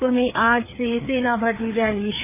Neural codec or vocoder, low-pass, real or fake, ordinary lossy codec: codec, 16 kHz, 4 kbps, FreqCodec, larger model; 3.6 kHz; fake; none